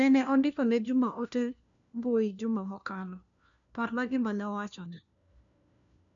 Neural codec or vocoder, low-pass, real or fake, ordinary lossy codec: codec, 16 kHz, 1 kbps, FunCodec, trained on LibriTTS, 50 frames a second; 7.2 kHz; fake; MP3, 96 kbps